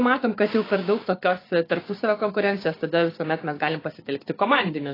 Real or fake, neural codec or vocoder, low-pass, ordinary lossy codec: fake; codec, 16 kHz, 6 kbps, DAC; 5.4 kHz; AAC, 24 kbps